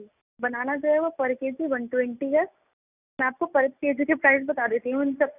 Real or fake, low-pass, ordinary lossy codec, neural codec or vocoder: real; 3.6 kHz; none; none